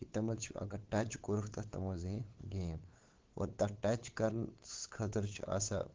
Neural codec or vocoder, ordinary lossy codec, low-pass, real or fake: codec, 16 kHz, 8 kbps, FunCodec, trained on LibriTTS, 25 frames a second; Opus, 16 kbps; 7.2 kHz; fake